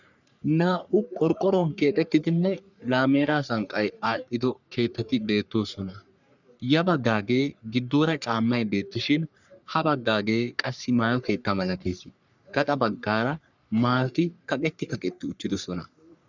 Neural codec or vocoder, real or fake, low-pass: codec, 44.1 kHz, 3.4 kbps, Pupu-Codec; fake; 7.2 kHz